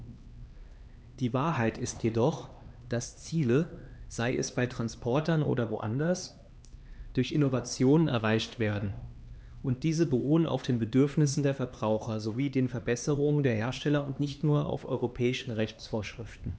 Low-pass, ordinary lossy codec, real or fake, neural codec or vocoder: none; none; fake; codec, 16 kHz, 2 kbps, X-Codec, HuBERT features, trained on LibriSpeech